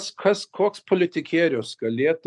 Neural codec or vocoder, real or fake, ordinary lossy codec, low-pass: none; real; MP3, 96 kbps; 10.8 kHz